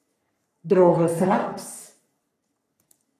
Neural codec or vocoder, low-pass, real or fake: codec, 44.1 kHz, 3.4 kbps, Pupu-Codec; 14.4 kHz; fake